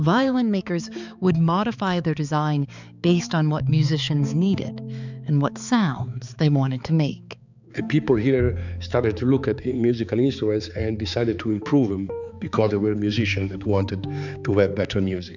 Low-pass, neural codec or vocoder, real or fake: 7.2 kHz; codec, 16 kHz, 4 kbps, X-Codec, HuBERT features, trained on balanced general audio; fake